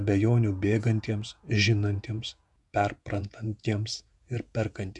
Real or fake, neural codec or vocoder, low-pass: fake; vocoder, 48 kHz, 128 mel bands, Vocos; 10.8 kHz